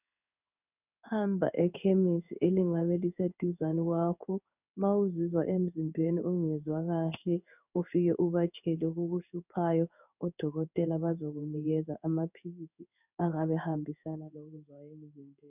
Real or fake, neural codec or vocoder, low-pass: fake; codec, 16 kHz in and 24 kHz out, 1 kbps, XY-Tokenizer; 3.6 kHz